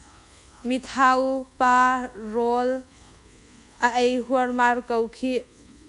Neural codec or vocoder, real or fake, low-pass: codec, 24 kHz, 1.2 kbps, DualCodec; fake; 10.8 kHz